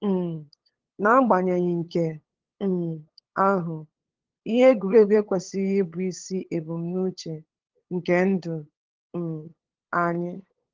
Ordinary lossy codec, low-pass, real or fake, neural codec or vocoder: Opus, 16 kbps; 7.2 kHz; fake; codec, 16 kHz, 8 kbps, FunCodec, trained on LibriTTS, 25 frames a second